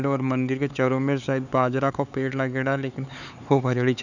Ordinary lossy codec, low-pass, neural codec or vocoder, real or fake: none; 7.2 kHz; codec, 16 kHz, 4 kbps, X-Codec, HuBERT features, trained on LibriSpeech; fake